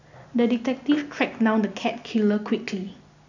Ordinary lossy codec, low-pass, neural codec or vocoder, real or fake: none; 7.2 kHz; none; real